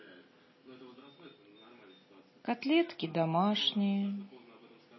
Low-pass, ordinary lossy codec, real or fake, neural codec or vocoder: 7.2 kHz; MP3, 24 kbps; real; none